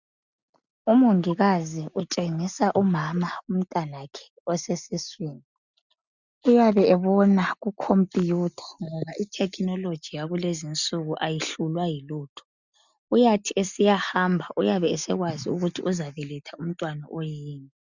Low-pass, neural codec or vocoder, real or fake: 7.2 kHz; none; real